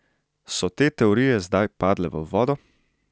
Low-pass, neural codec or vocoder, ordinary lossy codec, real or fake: none; none; none; real